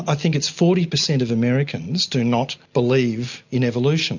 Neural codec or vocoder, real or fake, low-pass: none; real; 7.2 kHz